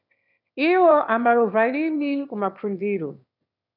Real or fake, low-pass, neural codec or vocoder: fake; 5.4 kHz; autoencoder, 22.05 kHz, a latent of 192 numbers a frame, VITS, trained on one speaker